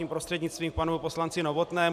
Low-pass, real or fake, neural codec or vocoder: 14.4 kHz; fake; vocoder, 44.1 kHz, 128 mel bands every 512 samples, BigVGAN v2